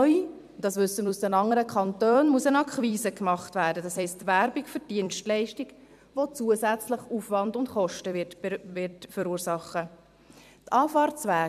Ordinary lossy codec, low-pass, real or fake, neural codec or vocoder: none; 14.4 kHz; fake; vocoder, 44.1 kHz, 128 mel bands every 256 samples, BigVGAN v2